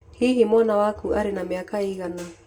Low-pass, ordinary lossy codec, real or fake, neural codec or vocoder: 19.8 kHz; Opus, 64 kbps; real; none